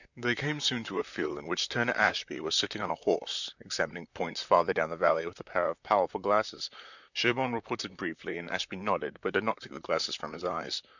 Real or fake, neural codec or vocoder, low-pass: fake; vocoder, 44.1 kHz, 128 mel bands, Pupu-Vocoder; 7.2 kHz